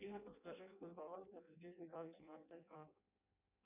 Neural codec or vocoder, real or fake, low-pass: codec, 16 kHz in and 24 kHz out, 0.6 kbps, FireRedTTS-2 codec; fake; 3.6 kHz